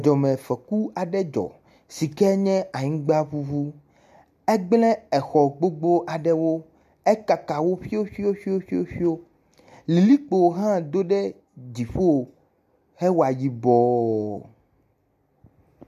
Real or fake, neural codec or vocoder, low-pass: real; none; 14.4 kHz